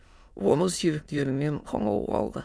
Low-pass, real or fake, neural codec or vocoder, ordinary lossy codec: none; fake; autoencoder, 22.05 kHz, a latent of 192 numbers a frame, VITS, trained on many speakers; none